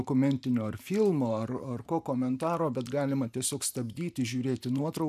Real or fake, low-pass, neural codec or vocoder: fake; 14.4 kHz; vocoder, 44.1 kHz, 128 mel bands every 512 samples, BigVGAN v2